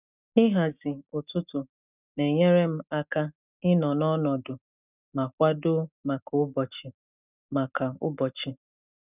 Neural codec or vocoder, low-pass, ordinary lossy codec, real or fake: none; 3.6 kHz; none; real